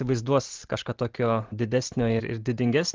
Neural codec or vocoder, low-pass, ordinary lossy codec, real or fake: none; 7.2 kHz; Opus, 16 kbps; real